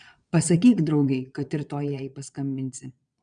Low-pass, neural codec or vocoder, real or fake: 9.9 kHz; vocoder, 22.05 kHz, 80 mel bands, Vocos; fake